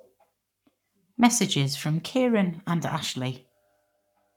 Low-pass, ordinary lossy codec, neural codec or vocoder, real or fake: 19.8 kHz; none; codec, 44.1 kHz, 7.8 kbps, Pupu-Codec; fake